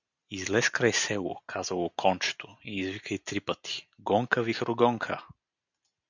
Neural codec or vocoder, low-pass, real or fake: none; 7.2 kHz; real